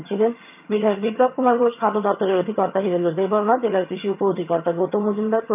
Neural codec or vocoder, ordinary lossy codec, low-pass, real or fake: vocoder, 22.05 kHz, 80 mel bands, HiFi-GAN; none; 3.6 kHz; fake